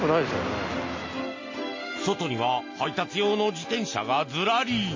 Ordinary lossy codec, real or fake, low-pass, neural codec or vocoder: MP3, 32 kbps; real; 7.2 kHz; none